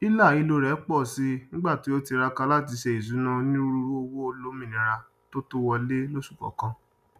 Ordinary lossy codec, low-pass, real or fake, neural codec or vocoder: none; 14.4 kHz; real; none